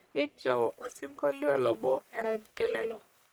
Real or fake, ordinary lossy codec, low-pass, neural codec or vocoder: fake; none; none; codec, 44.1 kHz, 1.7 kbps, Pupu-Codec